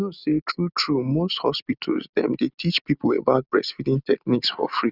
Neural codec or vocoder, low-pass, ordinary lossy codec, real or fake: none; 5.4 kHz; none; real